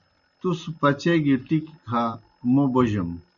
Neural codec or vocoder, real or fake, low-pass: none; real; 7.2 kHz